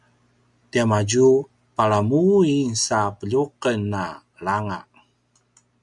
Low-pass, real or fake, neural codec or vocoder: 10.8 kHz; real; none